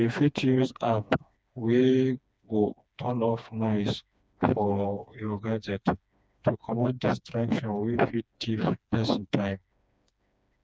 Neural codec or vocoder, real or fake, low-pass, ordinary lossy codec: codec, 16 kHz, 2 kbps, FreqCodec, smaller model; fake; none; none